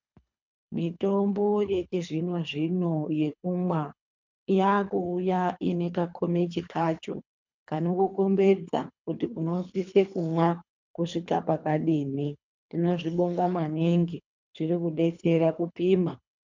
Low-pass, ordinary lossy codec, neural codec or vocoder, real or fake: 7.2 kHz; MP3, 64 kbps; codec, 24 kHz, 3 kbps, HILCodec; fake